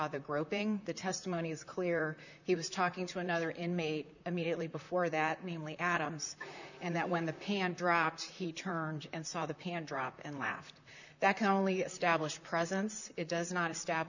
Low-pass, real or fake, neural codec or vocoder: 7.2 kHz; fake; vocoder, 44.1 kHz, 128 mel bands, Pupu-Vocoder